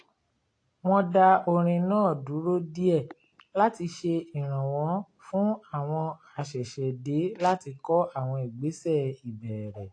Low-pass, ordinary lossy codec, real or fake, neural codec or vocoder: 9.9 kHz; AAC, 48 kbps; real; none